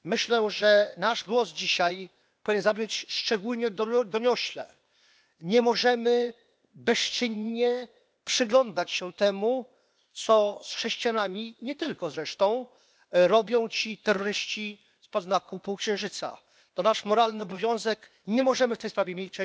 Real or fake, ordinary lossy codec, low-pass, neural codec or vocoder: fake; none; none; codec, 16 kHz, 0.8 kbps, ZipCodec